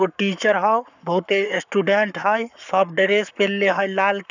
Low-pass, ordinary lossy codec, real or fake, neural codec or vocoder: 7.2 kHz; none; fake; codec, 44.1 kHz, 7.8 kbps, Pupu-Codec